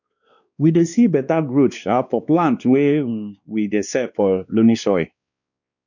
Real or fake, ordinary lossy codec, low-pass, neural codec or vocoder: fake; none; 7.2 kHz; codec, 16 kHz, 2 kbps, X-Codec, WavLM features, trained on Multilingual LibriSpeech